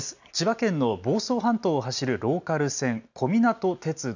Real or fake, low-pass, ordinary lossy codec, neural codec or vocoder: real; 7.2 kHz; none; none